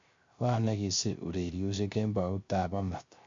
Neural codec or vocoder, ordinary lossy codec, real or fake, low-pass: codec, 16 kHz, 0.3 kbps, FocalCodec; MP3, 48 kbps; fake; 7.2 kHz